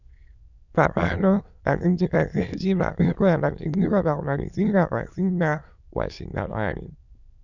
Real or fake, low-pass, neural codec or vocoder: fake; 7.2 kHz; autoencoder, 22.05 kHz, a latent of 192 numbers a frame, VITS, trained on many speakers